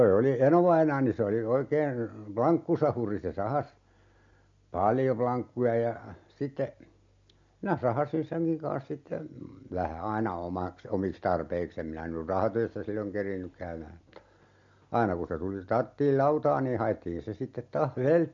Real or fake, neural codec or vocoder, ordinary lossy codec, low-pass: real; none; MP3, 48 kbps; 7.2 kHz